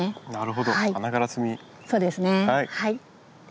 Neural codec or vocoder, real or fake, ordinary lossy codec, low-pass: none; real; none; none